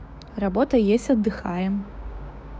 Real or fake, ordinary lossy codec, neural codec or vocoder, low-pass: fake; none; codec, 16 kHz, 6 kbps, DAC; none